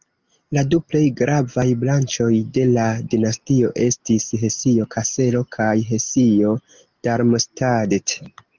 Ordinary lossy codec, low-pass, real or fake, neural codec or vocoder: Opus, 24 kbps; 7.2 kHz; real; none